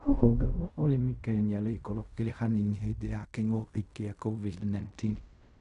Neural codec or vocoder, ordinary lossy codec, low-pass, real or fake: codec, 16 kHz in and 24 kHz out, 0.4 kbps, LongCat-Audio-Codec, fine tuned four codebook decoder; none; 10.8 kHz; fake